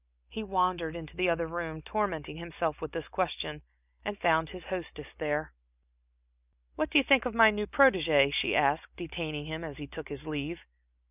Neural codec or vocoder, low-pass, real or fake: none; 3.6 kHz; real